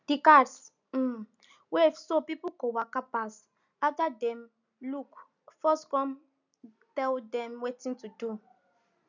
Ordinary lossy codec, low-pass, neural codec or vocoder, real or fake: none; 7.2 kHz; none; real